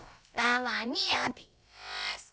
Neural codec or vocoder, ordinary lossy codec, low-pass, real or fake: codec, 16 kHz, about 1 kbps, DyCAST, with the encoder's durations; none; none; fake